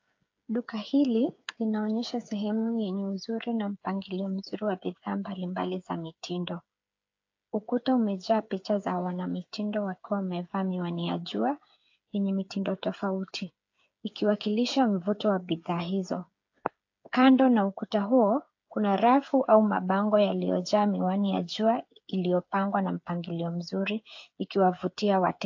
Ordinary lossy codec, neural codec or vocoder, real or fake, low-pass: AAC, 48 kbps; codec, 16 kHz, 8 kbps, FreqCodec, smaller model; fake; 7.2 kHz